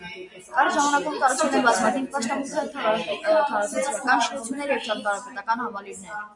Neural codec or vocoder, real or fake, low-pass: none; real; 10.8 kHz